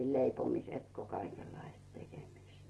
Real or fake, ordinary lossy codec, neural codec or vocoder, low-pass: real; Opus, 16 kbps; none; 10.8 kHz